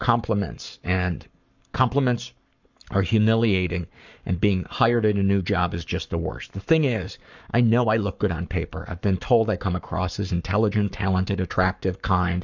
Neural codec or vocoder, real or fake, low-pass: codec, 44.1 kHz, 7.8 kbps, Pupu-Codec; fake; 7.2 kHz